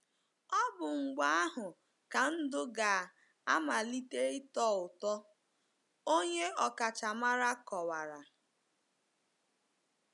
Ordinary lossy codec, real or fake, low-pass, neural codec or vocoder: none; real; 10.8 kHz; none